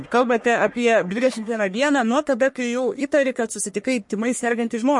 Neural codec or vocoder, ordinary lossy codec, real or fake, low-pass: codec, 44.1 kHz, 1.7 kbps, Pupu-Codec; MP3, 48 kbps; fake; 10.8 kHz